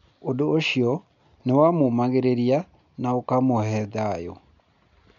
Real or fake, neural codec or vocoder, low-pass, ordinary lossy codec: real; none; 7.2 kHz; none